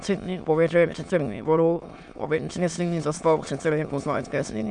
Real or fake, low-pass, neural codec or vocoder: fake; 9.9 kHz; autoencoder, 22.05 kHz, a latent of 192 numbers a frame, VITS, trained on many speakers